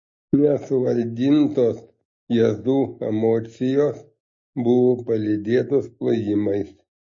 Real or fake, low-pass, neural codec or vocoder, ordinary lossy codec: real; 7.2 kHz; none; MP3, 32 kbps